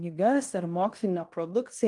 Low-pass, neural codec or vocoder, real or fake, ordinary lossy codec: 10.8 kHz; codec, 16 kHz in and 24 kHz out, 0.9 kbps, LongCat-Audio-Codec, fine tuned four codebook decoder; fake; Opus, 32 kbps